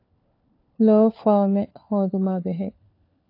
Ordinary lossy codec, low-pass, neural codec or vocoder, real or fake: AAC, 32 kbps; 5.4 kHz; codec, 16 kHz, 4 kbps, FunCodec, trained on LibriTTS, 50 frames a second; fake